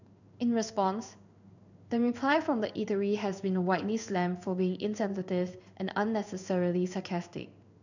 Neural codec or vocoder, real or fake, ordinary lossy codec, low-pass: codec, 16 kHz in and 24 kHz out, 1 kbps, XY-Tokenizer; fake; none; 7.2 kHz